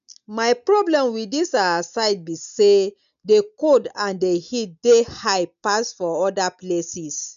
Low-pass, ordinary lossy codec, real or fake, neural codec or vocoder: 7.2 kHz; none; real; none